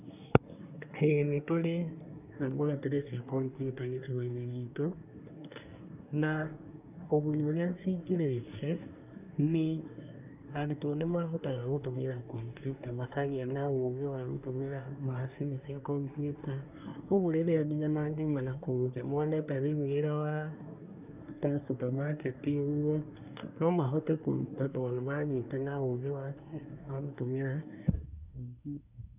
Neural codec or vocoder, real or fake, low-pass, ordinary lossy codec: codec, 24 kHz, 1 kbps, SNAC; fake; 3.6 kHz; none